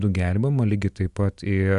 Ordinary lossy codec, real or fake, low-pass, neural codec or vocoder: Opus, 64 kbps; real; 10.8 kHz; none